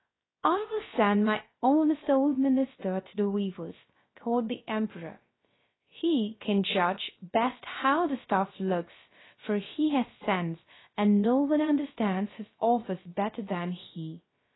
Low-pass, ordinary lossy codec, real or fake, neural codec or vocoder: 7.2 kHz; AAC, 16 kbps; fake; codec, 16 kHz, 0.3 kbps, FocalCodec